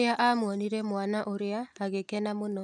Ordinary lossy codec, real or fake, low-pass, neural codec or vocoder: none; real; 9.9 kHz; none